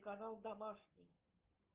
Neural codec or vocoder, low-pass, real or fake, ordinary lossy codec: none; 3.6 kHz; real; Opus, 32 kbps